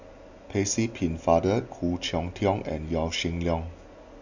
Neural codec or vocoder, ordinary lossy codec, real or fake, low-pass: none; none; real; 7.2 kHz